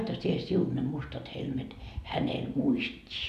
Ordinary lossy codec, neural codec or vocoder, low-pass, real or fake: none; none; 14.4 kHz; real